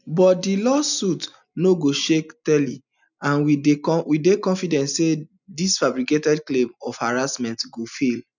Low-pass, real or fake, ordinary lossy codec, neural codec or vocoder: 7.2 kHz; real; none; none